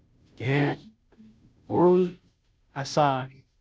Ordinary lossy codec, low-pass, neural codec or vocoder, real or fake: none; none; codec, 16 kHz, 0.5 kbps, FunCodec, trained on Chinese and English, 25 frames a second; fake